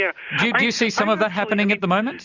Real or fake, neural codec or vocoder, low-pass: real; none; 7.2 kHz